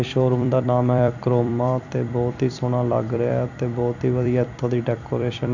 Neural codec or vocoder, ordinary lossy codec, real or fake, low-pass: vocoder, 44.1 kHz, 128 mel bands every 256 samples, BigVGAN v2; none; fake; 7.2 kHz